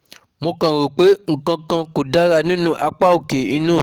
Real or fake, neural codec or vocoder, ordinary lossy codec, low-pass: fake; codec, 44.1 kHz, 7.8 kbps, DAC; Opus, 24 kbps; 19.8 kHz